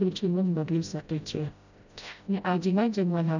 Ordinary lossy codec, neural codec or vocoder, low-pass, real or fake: none; codec, 16 kHz, 0.5 kbps, FreqCodec, smaller model; 7.2 kHz; fake